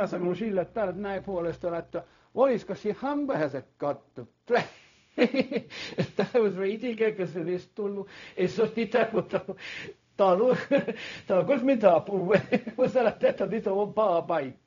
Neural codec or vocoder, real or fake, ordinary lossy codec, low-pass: codec, 16 kHz, 0.4 kbps, LongCat-Audio-Codec; fake; none; 7.2 kHz